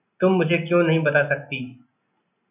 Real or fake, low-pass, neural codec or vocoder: real; 3.6 kHz; none